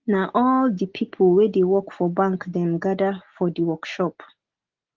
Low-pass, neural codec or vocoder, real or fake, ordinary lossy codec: 7.2 kHz; none; real; Opus, 16 kbps